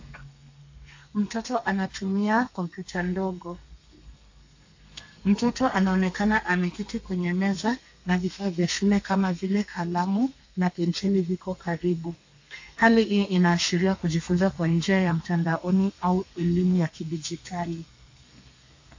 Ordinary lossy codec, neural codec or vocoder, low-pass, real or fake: AAC, 48 kbps; codec, 32 kHz, 1.9 kbps, SNAC; 7.2 kHz; fake